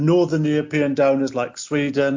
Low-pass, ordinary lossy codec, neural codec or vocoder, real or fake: 7.2 kHz; MP3, 48 kbps; none; real